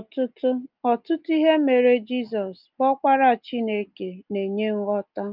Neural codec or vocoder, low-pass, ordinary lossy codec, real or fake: none; 5.4 kHz; Opus, 24 kbps; real